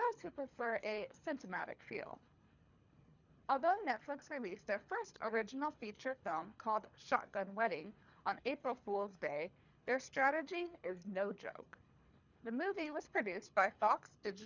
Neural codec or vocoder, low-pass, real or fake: codec, 24 kHz, 3 kbps, HILCodec; 7.2 kHz; fake